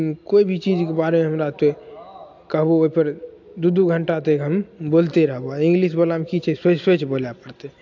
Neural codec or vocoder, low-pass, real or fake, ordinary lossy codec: none; 7.2 kHz; real; AAC, 48 kbps